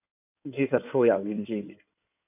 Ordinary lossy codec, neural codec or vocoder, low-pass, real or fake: none; codec, 16 kHz in and 24 kHz out, 2.2 kbps, FireRedTTS-2 codec; 3.6 kHz; fake